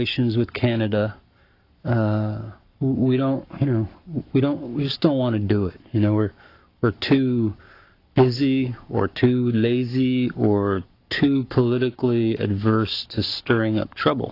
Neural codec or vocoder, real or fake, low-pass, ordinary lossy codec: codec, 44.1 kHz, 7.8 kbps, Pupu-Codec; fake; 5.4 kHz; AAC, 32 kbps